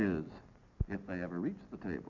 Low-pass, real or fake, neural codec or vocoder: 7.2 kHz; real; none